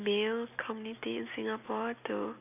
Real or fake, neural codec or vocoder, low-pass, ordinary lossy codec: real; none; 3.6 kHz; none